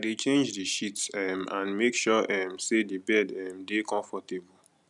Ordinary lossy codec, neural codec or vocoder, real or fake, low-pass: none; none; real; 10.8 kHz